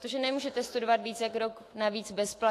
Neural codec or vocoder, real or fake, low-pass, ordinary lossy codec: autoencoder, 48 kHz, 128 numbers a frame, DAC-VAE, trained on Japanese speech; fake; 14.4 kHz; AAC, 48 kbps